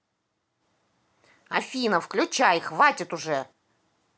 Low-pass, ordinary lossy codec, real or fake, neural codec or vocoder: none; none; real; none